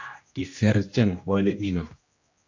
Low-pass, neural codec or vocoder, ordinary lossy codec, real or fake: 7.2 kHz; codec, 16 kHz, 1 kbps, X-Codec, HuBERT features, trained on general audio; AAC, 48 kbps; fake